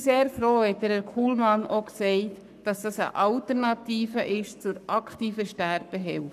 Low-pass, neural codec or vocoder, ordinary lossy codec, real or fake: 14.4 kHz; codec, 44.1 kHz, 7.8 kbps, Pupu-Codec; none; fake